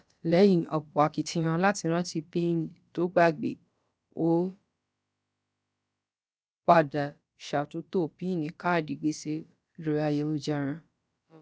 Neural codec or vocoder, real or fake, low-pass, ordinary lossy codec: codec, 16 kHz, about 1 kbps, DyCAST, with the encoder's durations; fake; none; none